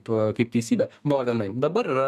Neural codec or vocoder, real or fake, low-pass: codec, 32 kHz, 1.9 kbps, SNAC; fake; 14.4 kHz